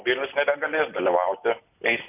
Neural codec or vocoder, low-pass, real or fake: none; 3.6 kHz; real